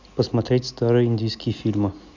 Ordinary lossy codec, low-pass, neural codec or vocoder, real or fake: none; 7.2 kHz; none; real